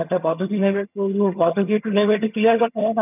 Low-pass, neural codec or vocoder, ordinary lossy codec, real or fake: 3.6 kHz; vocoder, 22.05 kHz, 80 mel bands, HiFi-GAN; none; fake